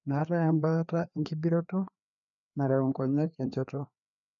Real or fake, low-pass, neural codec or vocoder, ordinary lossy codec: fake; 7.2 kHz; codec, 16 kHz, 2 kbps, FreqCodec, larger model; none